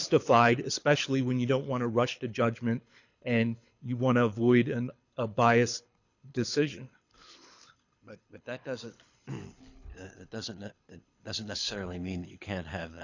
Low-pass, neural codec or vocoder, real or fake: 7.2 kHz; codec, 24 kHz, 6 kbps, HILCodec; fake